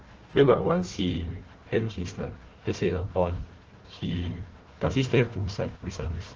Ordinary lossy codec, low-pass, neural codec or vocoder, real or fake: Opus, 16 kbps; 7.2 kHz; codec, 16 kHz, 1 kbps, FunCodec, trained on Chinese and English, 50 frames a second; fake